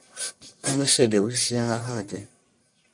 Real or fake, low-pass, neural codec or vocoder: fake; 10.8 kHz; codec, 44.1 kHz, 1.7 kbps, Pupu-Codec